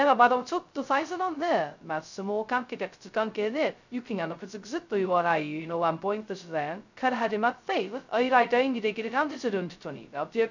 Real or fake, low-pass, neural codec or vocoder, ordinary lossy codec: fake; 7.2 kHz; codec, 16 kHz, 0.2 kbps, FocalCodec; none